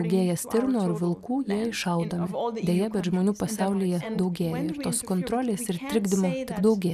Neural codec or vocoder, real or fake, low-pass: none; real; 14.4 kHz